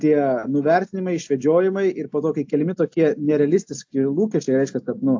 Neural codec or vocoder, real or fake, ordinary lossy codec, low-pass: none; real; AAC, 48 kbps; 7.2 kHz